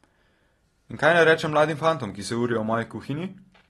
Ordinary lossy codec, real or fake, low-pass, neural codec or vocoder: AAC, 32 kbps; real; 19.8 kHz; none